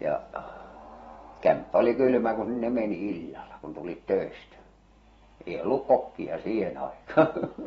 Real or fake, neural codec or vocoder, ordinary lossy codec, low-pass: fake; vocoder, 44.1 kHz, 128 mel bands every 512 samples, BigVGAN v2; AAC, 24 kbps; 19.8 kHz